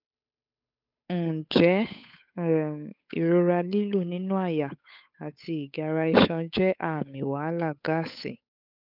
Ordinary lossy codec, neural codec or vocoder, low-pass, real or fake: none; codec, 16 kHz, 8 kbps, FunCodec, trained on Chinese and English, 25 frames a second; 5.4 kHz; fake